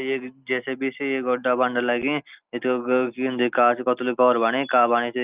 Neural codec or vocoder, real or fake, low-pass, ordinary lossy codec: none; real; 3.6 kHz; Opus, 24 kbps